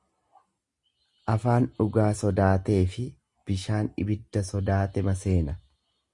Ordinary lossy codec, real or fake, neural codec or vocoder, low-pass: Opus, 64 kbps; real; none; 10.8 kHz